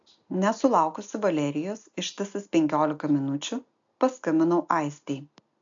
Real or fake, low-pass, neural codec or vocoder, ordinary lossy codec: real; 7.2 kHz; none; AAC, 64 kbps